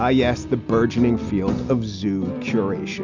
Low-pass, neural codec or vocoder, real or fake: 7.2 kHz; none; real